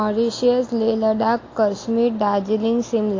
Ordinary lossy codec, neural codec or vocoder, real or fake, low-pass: AAC, 32 kbps; none; real; 7.2 kHz